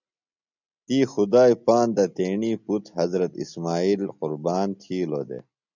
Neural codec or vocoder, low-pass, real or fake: none; 7.2 kHz; real